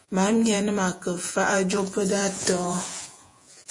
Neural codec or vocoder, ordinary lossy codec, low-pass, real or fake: vocoder, 48 kHz, 128 mel bands, Vocos; MP3, 48 kbps; 10.8 kHz; fake